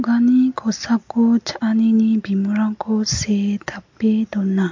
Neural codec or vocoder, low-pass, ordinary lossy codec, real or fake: none; 7.2 kHz; MP3, 48 kbps; real